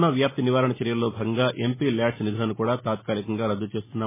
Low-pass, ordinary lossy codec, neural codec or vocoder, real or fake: 3.6 kHz; MP3, 16 kbps; none; real